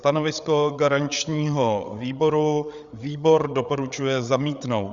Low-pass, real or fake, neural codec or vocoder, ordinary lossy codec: 7.2 kHz; fake; codec, 16 kHz, 8 kbps, FreqCodec, larger model; Opus, 64 kbps